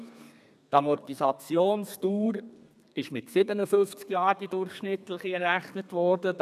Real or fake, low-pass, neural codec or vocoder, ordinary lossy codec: fake; 14.4 kHz; codec, 32 kHz, 1.9 kbps, SNAC; none